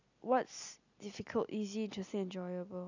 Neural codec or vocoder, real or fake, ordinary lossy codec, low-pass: none; real; none; 7.2 kHz